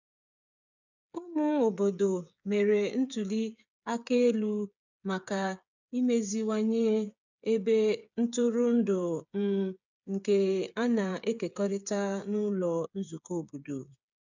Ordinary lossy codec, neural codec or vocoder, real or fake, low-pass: none; codec, 16 kHz, 8 kbps, FreqCodec, smaller model; fake; 7.2 kHz